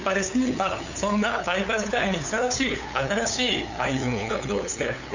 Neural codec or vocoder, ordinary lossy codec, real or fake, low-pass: codec, 16 kHz, 8 kbps, FunCodec, trained on LibriTTS, 25 frames a second; none; fake; 7.2 kHz